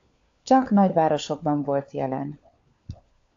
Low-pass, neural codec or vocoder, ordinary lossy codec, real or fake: 7.2 kHz; codec, 16 kHz, 4 kbps, FunCodec, trained on LibriTTS, 50 frames a second; AAC, 48 kbps; fake